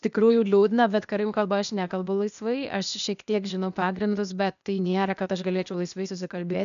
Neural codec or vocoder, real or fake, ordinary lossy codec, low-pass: codec, 16 kHz, 0.8 kbps, ZipCodec; fake; MP3, 96 kbps; 7.2 kHz